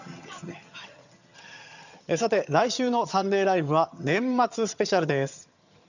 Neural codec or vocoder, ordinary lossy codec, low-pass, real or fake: vocoder, 22.05 kHz, 80 mel bands, HiFi-GAN; none; 7.2 kHz; fake